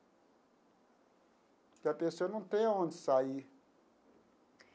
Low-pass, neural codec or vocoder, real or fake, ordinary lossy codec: none; none; real; none